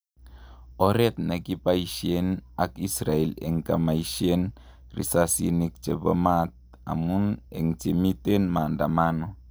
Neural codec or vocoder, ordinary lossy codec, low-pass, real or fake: none; none; none; real